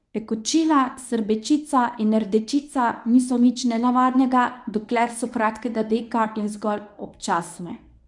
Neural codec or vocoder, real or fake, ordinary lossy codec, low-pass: codec, 24 kHz, 0.9 kbps, WavTokenizer, medium speech release version 1; fake; none; 10.8 kHz